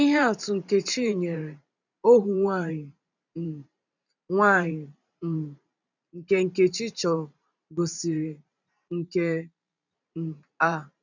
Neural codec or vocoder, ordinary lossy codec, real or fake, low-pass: vocoder, 44.1 kHz, 128 mel bands every 512 samples, BigVGAN v2; none; fake; 7.2 kHz